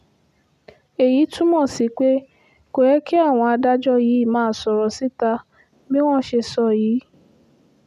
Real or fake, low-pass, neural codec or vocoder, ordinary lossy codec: real; 14.4 kHz; none; none